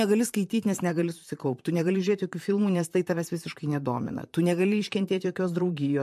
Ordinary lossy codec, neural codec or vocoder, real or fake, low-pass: MP3, 64 kbps; vocoder, 44.1 kHz, 128 mel bands, Pupu-Vocoder; fake; 14.4 kHz